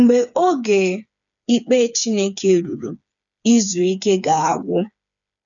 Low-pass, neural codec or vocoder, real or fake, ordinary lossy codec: 7.2 kHz; codec, 16 kHz, 4 kbps, FreqCodec, smaller model; fake; none